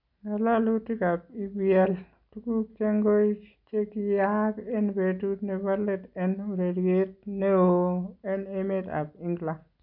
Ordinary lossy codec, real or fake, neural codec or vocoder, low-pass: none; real; none; 5.4 kHz